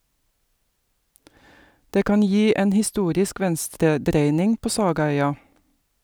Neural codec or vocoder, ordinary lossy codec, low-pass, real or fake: none; none; none; real